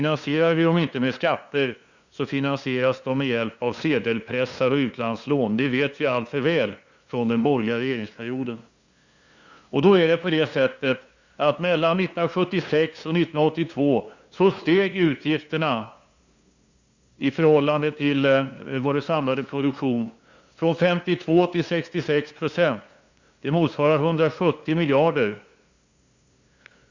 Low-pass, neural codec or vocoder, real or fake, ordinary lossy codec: 7.2 kHz; codec, 16 kHz, 2 kbps, FunCodec, trained on LibriTTS, 25 frames a second; fake; Opus, 64 kbps